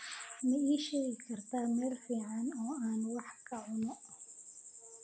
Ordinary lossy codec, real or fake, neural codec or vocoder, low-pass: none; real; none; none